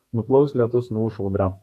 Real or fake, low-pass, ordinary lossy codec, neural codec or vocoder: fake; 14.4 kHz; AAC, 64 kbps; codec, 32 kHz, 1.9 kbps, SNAC